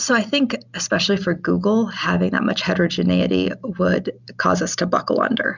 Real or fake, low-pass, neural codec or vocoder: real; 7.2 kHz; none